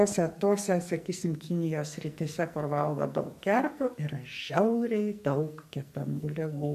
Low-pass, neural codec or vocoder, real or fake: 14.4 kHz; codec, 44.1 kHz, 2.6 kbps, SNAC; fake